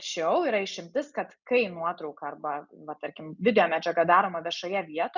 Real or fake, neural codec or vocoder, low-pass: real; none; 7.2 kHz